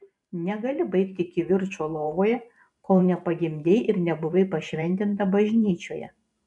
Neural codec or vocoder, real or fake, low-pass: vocoder, 44.1 kHz, 128 mel bands every 512 samples, BigVGAN v2; fake; 10.8 kHz